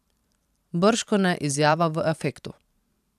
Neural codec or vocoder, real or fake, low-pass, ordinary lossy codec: vocoder, 44.1 kHz, 128 mel bands every 512 samples, BigVGAN v2; fake; 14.4 kHz; none